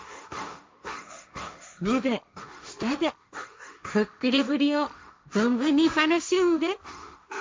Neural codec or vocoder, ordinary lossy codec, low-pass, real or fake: codec, 16 kHz, 1.1 kbps, Voila-Tokenizer; none; none; fake